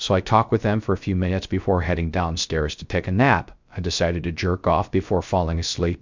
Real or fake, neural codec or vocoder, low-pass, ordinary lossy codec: fake; codec, 16 kHz, 0.3 kbps, FocalCodec; 7.2 kHz; MP3, 64 kbps